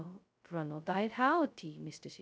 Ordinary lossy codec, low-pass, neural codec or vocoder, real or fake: none; none; codec, 16 kHz, 0.2 kbps, FocalCodec; fake